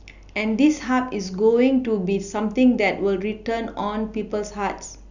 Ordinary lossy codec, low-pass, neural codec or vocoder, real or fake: none; 7.2 kHz; none; real